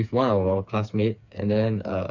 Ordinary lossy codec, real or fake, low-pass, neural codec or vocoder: MP3, 64 kbps; fake; 7.2 kHz; codec, 16 kHz, 4 kbps, FreqCodec, smaller model